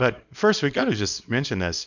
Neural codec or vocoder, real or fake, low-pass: codec, 24 kHz, 0.9 kbps, WavTokenizer, small release; fake; 7.2 kHz